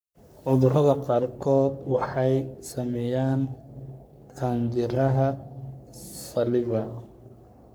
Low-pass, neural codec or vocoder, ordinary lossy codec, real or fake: none; codec, 44.1 kHz, 3.4 kbps, Pupu-Codec; none; fake